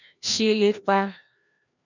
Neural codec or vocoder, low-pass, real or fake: codec, 16 kHz, 1 kbps, FreqCodec, larger model; 7.2 kHz; fake